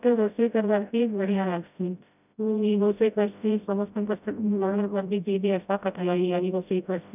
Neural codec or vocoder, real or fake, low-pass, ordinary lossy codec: codec, 16 kHz, 0.5 kbps, FreqCodec, smaller model; fake; 3.6 kHz; none